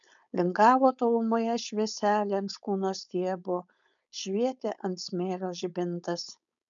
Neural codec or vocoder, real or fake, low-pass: codec, 16 kHz, 4.8 kbps, FACodec; fake; 7.2 kHz